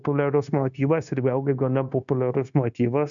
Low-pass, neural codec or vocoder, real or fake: 7.2 kHz; codec, 16 kHz, 0.9 kbps, LongCat-Audio-Codec; fake